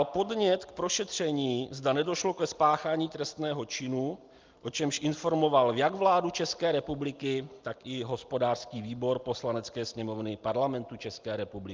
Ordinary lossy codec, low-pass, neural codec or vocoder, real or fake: Opus, 32 kbps; 7.2 kHz; none; real